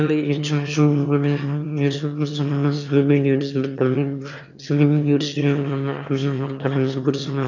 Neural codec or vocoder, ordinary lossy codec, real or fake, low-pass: autoencoder, 22.05 kHz, a latent of 192 numbers a frame, VITS, trained on one speaker; none; fake; 7.2 kHz